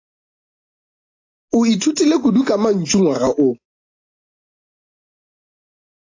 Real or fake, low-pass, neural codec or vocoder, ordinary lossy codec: real; 7.2 kHz; none; AAC, 32 kbps